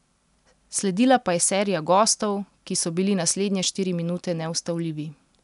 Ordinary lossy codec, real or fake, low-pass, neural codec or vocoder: none; real; 10.8 kHz; none